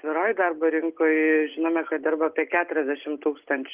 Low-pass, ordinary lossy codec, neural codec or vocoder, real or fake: 3.6 kHz; Opus, 32 kbps; none; real